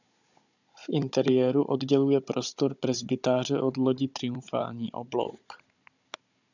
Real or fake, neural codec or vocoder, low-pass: fake; codec, 16 kHz, 16 kbps, FunCodec, trained on Chinese and English, 50 frames a second; 7.2 kHz